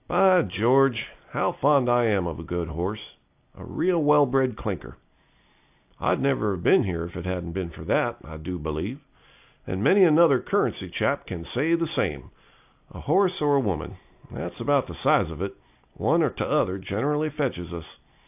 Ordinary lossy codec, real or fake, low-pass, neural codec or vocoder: AAC, 32 kbps; real; 3.6 kHz; none